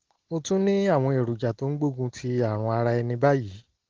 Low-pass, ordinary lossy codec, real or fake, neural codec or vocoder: 7.2 kHz; Opus, 16 kbps; real; none